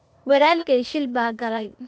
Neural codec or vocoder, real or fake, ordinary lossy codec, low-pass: codec, 16 kHz, 0.8 kbps, ZipCodec; fake; none; none